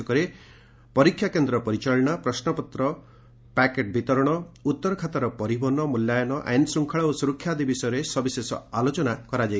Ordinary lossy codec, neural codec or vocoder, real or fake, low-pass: none; none; real; none